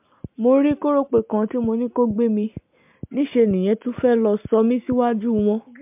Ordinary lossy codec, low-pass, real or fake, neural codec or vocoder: MP3, 32 kbps; 3.6 kHz; real; none